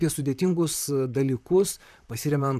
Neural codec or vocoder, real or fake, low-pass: vocoder, 44.1 kHz, 128 mel bands, Pupu-Vocoder; fake; 14.4 kHz